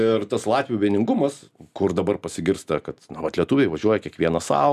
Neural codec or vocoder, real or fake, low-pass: none; real; 14.4 kHz